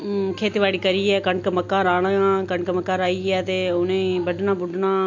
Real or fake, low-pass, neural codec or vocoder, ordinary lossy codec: real; 7.2 kHz; none; MP3, 48 kbps